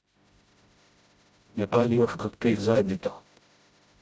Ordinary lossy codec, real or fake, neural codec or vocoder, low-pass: none; fake; codec, 16 kHz, 0.5 kbps, FreqCodec, smaller model; none